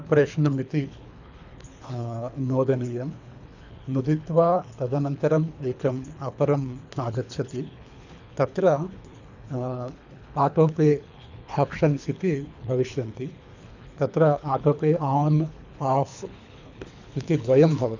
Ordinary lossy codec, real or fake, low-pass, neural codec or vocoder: none; fake; 7.2 kHz; codec, 24 kHz, 3 kbps, HILCodec